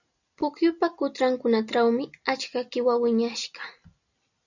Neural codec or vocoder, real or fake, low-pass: none; real; 7.2 kHz